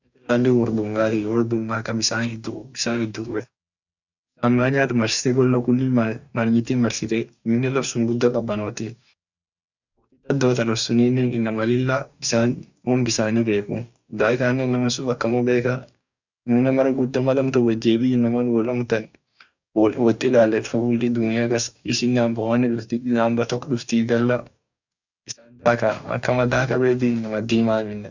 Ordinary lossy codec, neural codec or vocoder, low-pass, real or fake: none; codec, 44.1 kHz, 2.6 kbps, DAC; 7.2 kHz; fake